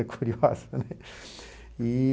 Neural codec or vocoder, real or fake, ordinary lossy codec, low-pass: none; real; none; none